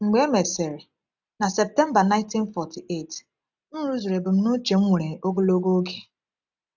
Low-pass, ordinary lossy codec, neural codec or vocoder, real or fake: 7.2 kHz; none; none; real